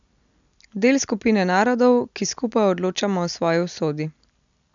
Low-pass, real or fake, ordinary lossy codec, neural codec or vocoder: 7.2 kHz; real; none; none